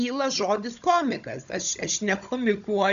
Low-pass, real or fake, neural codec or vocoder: 7.2 kHz; fake; codec, 16 kHz, 16 kbps, FunCodec, trained on Chinese and English, 50 frames a second